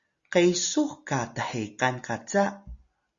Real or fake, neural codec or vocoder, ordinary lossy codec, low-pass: real; none; Opus, 64 kbps; 7.2 kHz